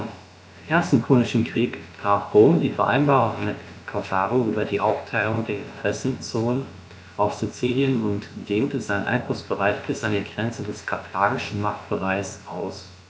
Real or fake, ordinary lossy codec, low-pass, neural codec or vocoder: fake; none; none; codec, 16 kHz, about 1 kbps, DyCAST, with the encoder's durations